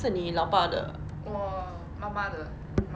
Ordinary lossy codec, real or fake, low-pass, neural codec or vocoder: none; real; none; none